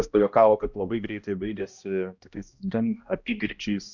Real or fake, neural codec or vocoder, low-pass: fake; codec, 16 kHz, 1 kbps, X-Codec, HuBERT features, trained on balanced general audio; 7.2 kHz